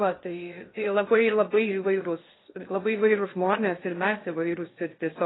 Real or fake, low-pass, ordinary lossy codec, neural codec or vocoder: fake; 7.2 kHz; AAC, 16 kbps; codec, 16 kHz in and 24 kHz out, 0.6 kbps, FocalCodec, streaming, 2048 codes